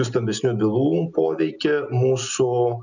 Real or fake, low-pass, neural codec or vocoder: real; 7.2 kHz; none